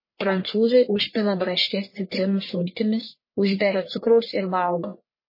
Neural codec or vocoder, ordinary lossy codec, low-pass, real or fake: codec, 44.1 kHz, 1.7 kbps, Pupu-Codec; MP3, 24 kbps; 5.4 kHz; fake